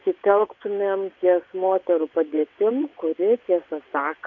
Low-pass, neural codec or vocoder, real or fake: 7.2 kHz; vocoder, 22.05 kHz, 80 mel bands, WaveNeXt; fake